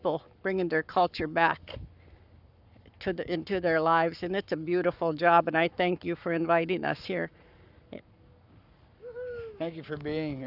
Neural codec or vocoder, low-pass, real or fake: codec, 44.1 kHz, 7.8 kbps, Pupu-Codec; 5.4 kHz; fake